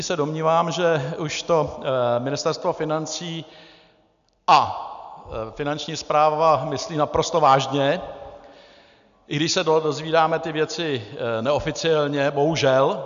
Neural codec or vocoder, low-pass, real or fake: none; 7.2 kHz; real